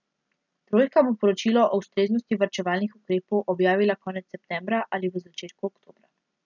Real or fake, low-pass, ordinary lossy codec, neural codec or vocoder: real; 7.2 kHz; none; none